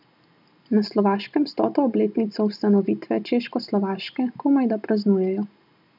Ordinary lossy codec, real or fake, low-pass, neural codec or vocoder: none; real; 5.4 kHz; none